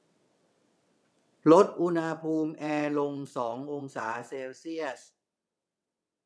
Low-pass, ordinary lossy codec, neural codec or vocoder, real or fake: none; none; vocoder, 22.05 kHz, 80 mel bands, WaveNeXt; fake